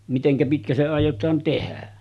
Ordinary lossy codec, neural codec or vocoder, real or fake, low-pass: none; none; real; none